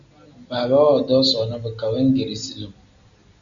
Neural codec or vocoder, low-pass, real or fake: none; 7.2 kHz; real